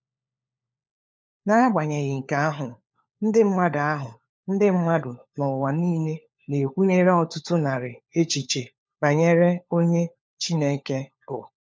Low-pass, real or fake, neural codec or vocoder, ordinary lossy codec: none; fake; codec, 16 kHz, 4 kbps, FunCodec, trained on LibriTTS, 50 frames a second; none